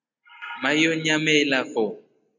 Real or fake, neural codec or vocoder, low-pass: real; none; 7.2 kHz